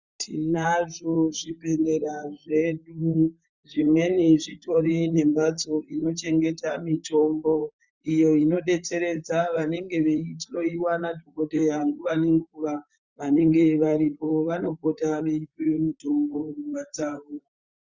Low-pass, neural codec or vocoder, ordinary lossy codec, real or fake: 7.2 kHz; vocoder, 44.1 kHz, 128 mel bands, Pupu-Vocoder; Opus, 64 kbps; fake